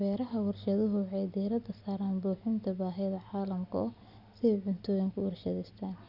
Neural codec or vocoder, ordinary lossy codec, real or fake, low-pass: none; none; real; 5.4 kHz